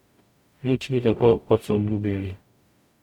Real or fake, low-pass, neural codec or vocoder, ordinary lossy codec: fake; 19.8 kHz; codec, 44.1 kHz, 0.9 kbps, DAC; none